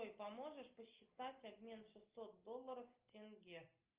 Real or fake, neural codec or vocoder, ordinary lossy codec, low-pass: real; none; Opus, 24 kbps; 3.6 kHz